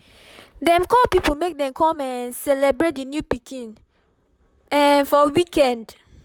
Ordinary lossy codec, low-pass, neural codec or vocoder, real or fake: none; 19.8 kHz; vocoder, 44.1 kHz, 128 mel bands, Pupu-Vocoder; fake